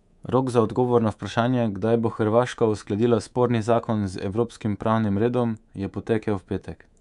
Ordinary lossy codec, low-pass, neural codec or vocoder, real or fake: none; 10.8 kHz; codec, 24 kHz, 3.1 kbps, DualCodec; fake